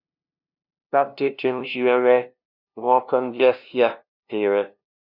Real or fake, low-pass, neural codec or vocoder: fake; 5.4 kHz; codec, 16 kHz, 0.5 kbps, FunCodec, trained on LibriTTS, 25 frames a second